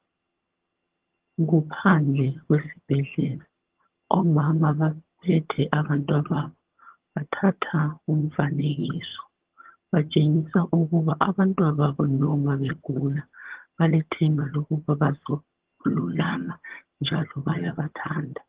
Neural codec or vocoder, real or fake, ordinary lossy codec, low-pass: vocoder, 22.05 kHz, 80 mel bands, HiFi-GAN; fake; Opus, 16 kbps; 3.6 kHz